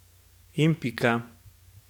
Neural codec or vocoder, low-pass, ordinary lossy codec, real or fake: codec, 44.1 kHz, 7.8 kbps, DAC; 19.8 kHz; none; fake